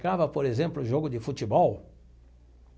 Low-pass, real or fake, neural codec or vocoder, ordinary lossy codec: none; real; none; none